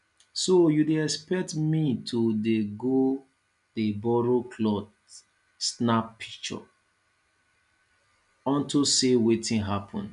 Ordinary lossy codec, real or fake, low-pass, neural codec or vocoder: none; real; 10.8 kHz; none